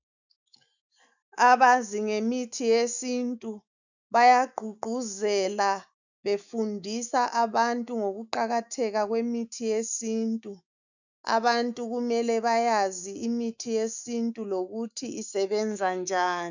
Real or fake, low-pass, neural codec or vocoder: fake; 7.2 kHz; autoencoder, 48 kHz, 128 numbers a frame, DAC-VAE, trained on Japanese speech